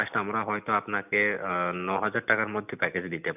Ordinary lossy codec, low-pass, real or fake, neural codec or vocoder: none; 3.6 kHz; real; none